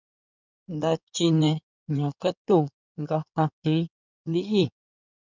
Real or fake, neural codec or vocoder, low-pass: fake; codec, 16 kHz in and 24 kHz out, 2.2 kbps, FireRedTTS-2 codec; 7.2 kHz